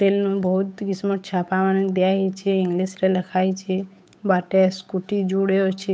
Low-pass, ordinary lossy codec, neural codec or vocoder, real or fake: none; none; codec, 16 kHz, 8 kbps, FunCodec, trained on Chinese and English, 25 frames a second; fake